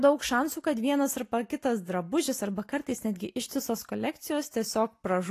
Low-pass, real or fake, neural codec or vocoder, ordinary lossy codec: 14.4 kHz; real; none; AAC, 48 kbps